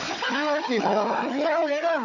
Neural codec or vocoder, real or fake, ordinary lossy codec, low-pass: codec, 16 kHz, 4 kbps, FunCodec, trained on Chinese and English, 50 frames a second; fake; none; 7.2 kHz